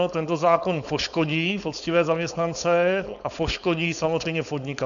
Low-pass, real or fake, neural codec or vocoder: 7.2 kHz; fake; codec, 16 kHz, 4.8 kbps, FACodec